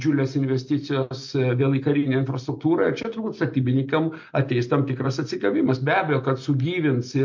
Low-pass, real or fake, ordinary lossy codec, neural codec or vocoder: 7.2 kHz; real; MP3, 48 kbps; none